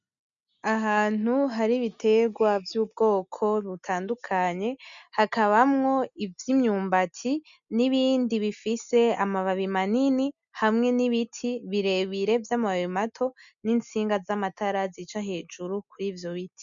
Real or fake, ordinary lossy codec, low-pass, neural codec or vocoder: real; MP3, 96 kbps; 7.2 kHz; none